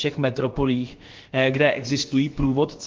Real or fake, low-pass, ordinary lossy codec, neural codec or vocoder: fake; 7.2 kHz; Opus, 16 kbps; codec, 16 kHz, about 1 kbps, DyCAST, with the encoder's durations